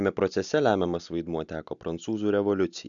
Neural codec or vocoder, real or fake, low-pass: none; real; 7.2 kHz